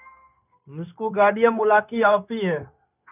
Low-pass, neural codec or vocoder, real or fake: 3.6 kHz; codec, 16 kHz, 0.9 kbps, LongCat-Audio-Codec; fake